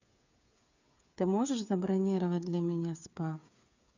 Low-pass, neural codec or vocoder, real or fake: 7.2 kHz; codec, 16 kHz, 8 kbps, FreqCodec, smaller model; fake